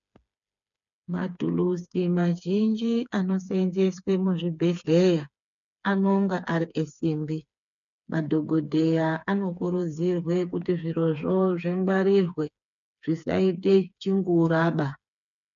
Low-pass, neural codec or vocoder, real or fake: 7.2 kHz; codec, 16 kHz, 4 kbps, FreqCodec, smaller model; fake